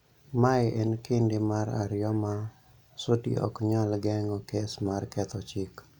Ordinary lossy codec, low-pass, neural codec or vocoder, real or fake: none; 19.8 kHz; none; real